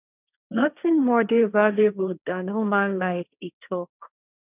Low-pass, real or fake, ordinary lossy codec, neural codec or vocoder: 3.6 kHz; fake; none; codec, 16 kHz, 1.1 kbps, Voila-Tokenizer